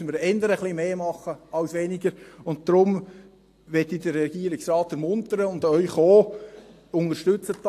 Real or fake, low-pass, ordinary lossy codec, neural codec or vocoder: real; 14.4 kHz; AAC, 64 kbps; none